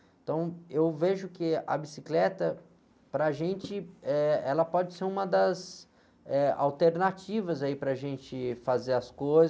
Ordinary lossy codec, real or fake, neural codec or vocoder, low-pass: none; real; none; none